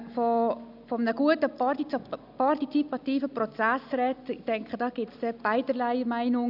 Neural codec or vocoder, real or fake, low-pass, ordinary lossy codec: none; real; 5.4 kHz; none